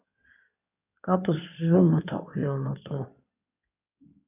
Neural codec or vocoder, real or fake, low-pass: codec, 16 kHz in and 24 kHz out, 2.2 kbps, FireRedTTS-2 codec; fake; 3.6 kHz